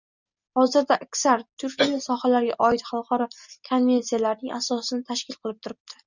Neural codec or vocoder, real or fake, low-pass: none; real; 7.2 kHz